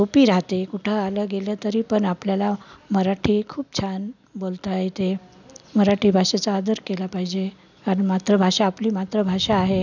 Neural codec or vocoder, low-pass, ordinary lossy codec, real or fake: none; 7.2 kHz; none; real